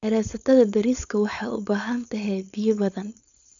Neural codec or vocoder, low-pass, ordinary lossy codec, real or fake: codec, 16 kHz, 4.8 kbps, FACodec; 7.2 kHz; none; fake